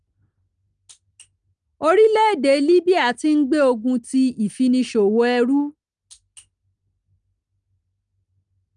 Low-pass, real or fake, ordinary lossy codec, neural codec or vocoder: 9.9 kHz; real; Opus, 32 kbps; none